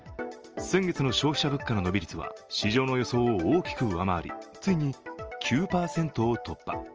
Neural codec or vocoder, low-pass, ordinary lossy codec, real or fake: none; 7.2 kHz; Opus, 24 kbps; real